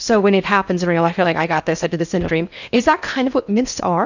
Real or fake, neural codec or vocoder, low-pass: fake; codec, 16 kHz in and 24 kHz out, 0.8 kbps, FocalCodec, streaming, 65536 codes; 7.2 kHz